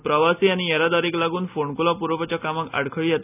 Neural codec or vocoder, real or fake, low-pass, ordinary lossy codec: none; real; 3.6 kHz; none